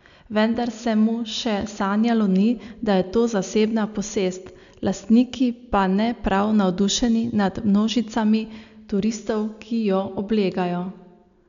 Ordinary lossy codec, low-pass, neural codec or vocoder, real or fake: none; 7.2 kHz; none; real